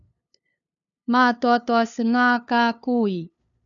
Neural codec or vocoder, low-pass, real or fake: codec, 16 kHz, 2 kbps, FunCodec, trained on LibriTTS, 25 frames a second; 7.2 kHz; fake